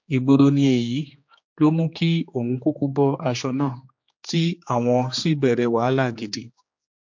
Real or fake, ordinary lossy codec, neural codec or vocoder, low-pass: fake; MP3, 48 kbps; codec, 16 kHz, 2 kbps, X-Codec, HuBERT features, trained on general audio; 7.2 kHz